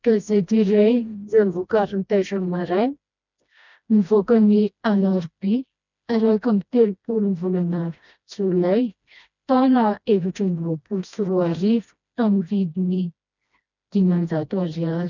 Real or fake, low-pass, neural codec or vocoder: fake; 7.2 kHz; codec, 16 kHz, 1 kbps, FreqCodec, smaller model